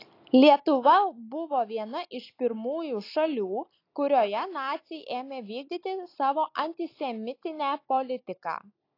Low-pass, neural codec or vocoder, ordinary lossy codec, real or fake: 5.4 kHz; none; AAC, 32 kbps; real